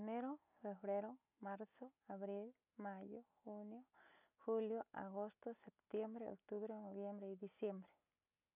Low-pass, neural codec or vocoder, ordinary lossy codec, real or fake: 3.6 kHz; none; none; real